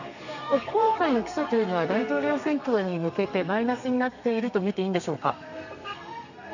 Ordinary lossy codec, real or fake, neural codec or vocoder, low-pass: none; fake; codec, 32 kHz, 1.9 kbps, SNAC; 7.2 kHz